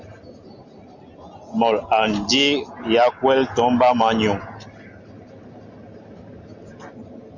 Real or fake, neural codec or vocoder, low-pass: real; none; 7.2 kHz